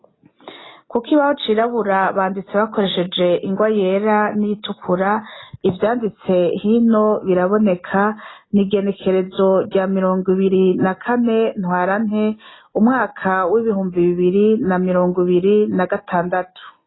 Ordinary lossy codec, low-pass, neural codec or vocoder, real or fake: AAC, 16 kbps; 7.2 kHz; none; real